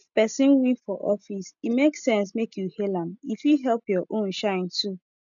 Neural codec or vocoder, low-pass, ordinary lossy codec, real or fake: none; 7.2 kHz; none; real